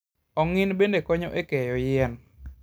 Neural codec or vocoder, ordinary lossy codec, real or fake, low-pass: none; none; real; none